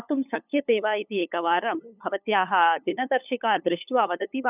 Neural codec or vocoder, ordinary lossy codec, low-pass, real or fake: codec, 16 kHz, 8 kbps, FunCodec, trained on LibriTTS, 25 frames a second; none; 3.6 kHz; fake